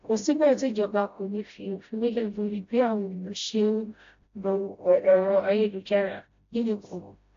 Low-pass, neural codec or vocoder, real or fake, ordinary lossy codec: 7.2 kHz; codec, 16 kHz, 0.5 kbps, FreqCodec, smaller model; fake; AAC, 48 kbps